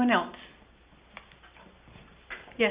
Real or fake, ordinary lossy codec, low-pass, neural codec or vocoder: real; Opus, 64 kbps; 3.6 kHz; none